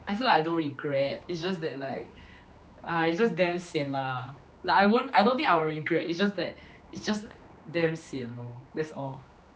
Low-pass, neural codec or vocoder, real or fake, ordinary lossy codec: none; codec, 16 kHz, 2 kbps, X-Codec, HuBERT features, trained on general audio; fake; none